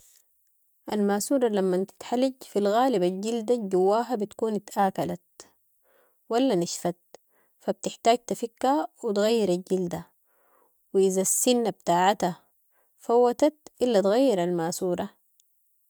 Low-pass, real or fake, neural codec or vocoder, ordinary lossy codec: none; real; none; none